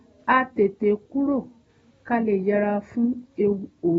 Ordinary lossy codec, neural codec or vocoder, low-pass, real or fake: AAC, 24 kbps; none; 19.8 kHz; real